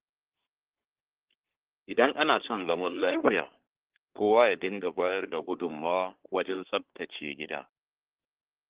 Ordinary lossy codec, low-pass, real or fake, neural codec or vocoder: Opus, 16 kbps; 3.6 kHz; fake; codec, 24 kHz, 1 kbps, SNAC